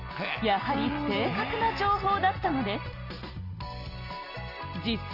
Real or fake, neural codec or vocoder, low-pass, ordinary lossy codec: real; none; 5.4 kHz; Opus, 24 kbps